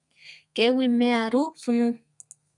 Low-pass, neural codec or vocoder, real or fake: 10.8 kHz; codec, 32 kHz, 1.9 kbps, SNAC; fake